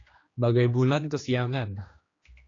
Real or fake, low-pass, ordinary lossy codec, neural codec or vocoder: fake; 7.2 kHz; AAC, 32 kbps; codec, 16 kHz, 2 kbps, X-Codec, HuBERT features, trained on general audio